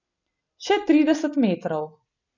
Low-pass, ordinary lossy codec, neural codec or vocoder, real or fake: 7.2 kHz; none; none; real